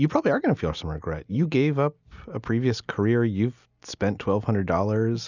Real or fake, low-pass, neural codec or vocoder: real; 7.2 kHz; none